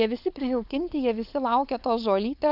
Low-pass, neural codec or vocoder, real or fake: 5.4 kHz; codec, 16 kHz, 4.8 kbps, FACodec; fake